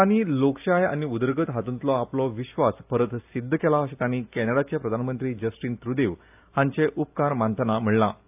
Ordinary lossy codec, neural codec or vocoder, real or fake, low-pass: AAC, 32 kbps; none; real; 3.6 kHz